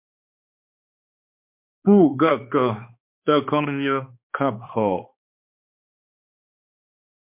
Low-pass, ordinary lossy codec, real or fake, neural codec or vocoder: 3.6 kHz; MP3, 32 kbps; fake; codec, 16 kHz, 2 kbps, X-Codec, HuBERT features, trained on balanced general audio